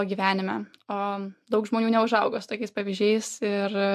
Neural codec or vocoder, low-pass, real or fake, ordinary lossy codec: none; 14.4 kHz; real; MP3, 64 kbps